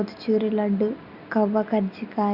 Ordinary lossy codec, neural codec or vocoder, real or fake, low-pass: Opus, 64 kbps; none; real; 5.4 kHz